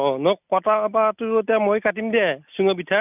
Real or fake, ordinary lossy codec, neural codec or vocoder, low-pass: real; none; none; 3.6 kHz